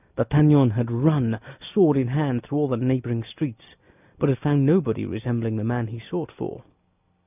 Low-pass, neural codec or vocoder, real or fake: 3.6 kHz; none; real